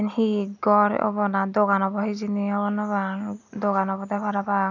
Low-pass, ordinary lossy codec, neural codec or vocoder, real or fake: 7.2 kHz; none; none; real